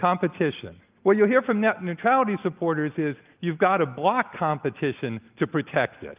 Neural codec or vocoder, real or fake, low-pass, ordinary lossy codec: none; real; 3.6 kHz; Opus, 24 kbps